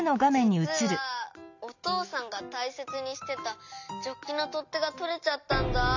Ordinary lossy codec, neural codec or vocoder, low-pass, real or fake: none; none; 7.2 kHz; real